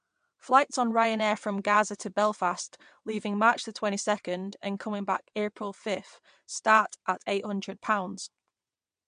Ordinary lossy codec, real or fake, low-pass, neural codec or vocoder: MP3, 64 kbps; fake; 9.9 kHz; vocoder, 22.05 kHz, 80 mel bands, WaveNeXt